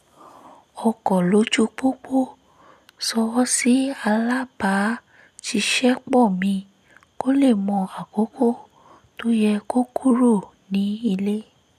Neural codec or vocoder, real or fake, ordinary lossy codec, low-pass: none; real; none; 14.4 kHz